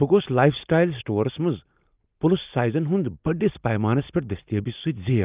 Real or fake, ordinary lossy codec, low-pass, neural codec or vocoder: real; Opus, 32 kbps; 3.6 kHz; none